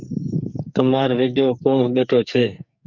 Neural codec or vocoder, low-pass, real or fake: codec, 44.1 kHz, 2.6 kbps, SNAC; 7.2 kHz; fake